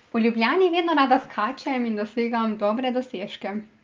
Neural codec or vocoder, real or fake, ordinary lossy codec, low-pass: none; real; Opus, 32 kbps; 7.2 kHz